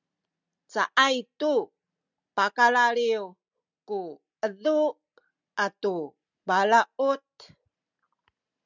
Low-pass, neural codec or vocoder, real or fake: 7.2 kHz; none; real